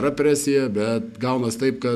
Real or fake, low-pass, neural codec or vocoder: real; 14.4 kHz; none